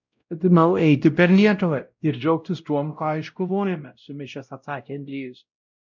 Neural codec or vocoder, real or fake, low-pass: codec, 16 kHz, 0.5 kbps, X-Codec, WavLM features, trained on Multilingual LibriSpeech; fake; 7.2 kHz